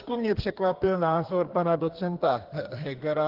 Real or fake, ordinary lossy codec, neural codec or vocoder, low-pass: fake; Opus, 16 kbps; codec, 32 kHz, 1.9 kbps, SNAC; 5.4 kHz